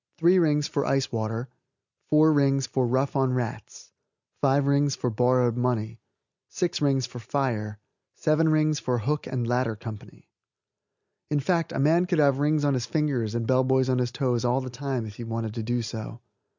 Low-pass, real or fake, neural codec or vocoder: 7.2 kHz; real; none